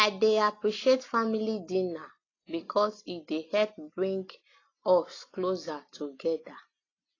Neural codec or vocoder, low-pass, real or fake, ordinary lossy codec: none; 7.2 kHz; real; AAC, 32 kbps